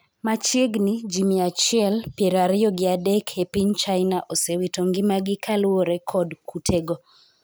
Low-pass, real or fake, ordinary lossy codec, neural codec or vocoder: none; real; none; none